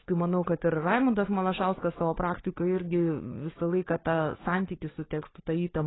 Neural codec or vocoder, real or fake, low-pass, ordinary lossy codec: codec, 16 kHz, 4 kbps, FunCodec, trained on Chinese and English, 50 frames a second; fake; 7.2 kHz; AAC, 16 kbps